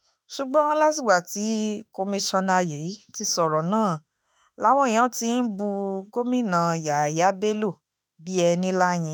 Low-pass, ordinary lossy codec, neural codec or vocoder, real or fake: none; none; autoencoder, 48 kHz, 32 numbers a frame, DAC-VAE, trained on Japanese speech; fake